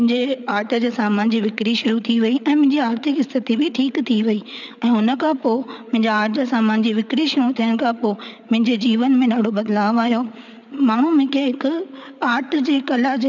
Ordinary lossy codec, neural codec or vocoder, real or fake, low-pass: none; codec, 16 kHz, 8 kbps, FreqCodec, larger model; fake; 7.2 kHz